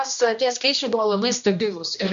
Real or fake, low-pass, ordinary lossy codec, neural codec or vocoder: fake; 7.2 kHz; MP3, 64 kbps; codec, 16 kHz, 1 kbps, X-Codec, HuBERT features, trained on general audio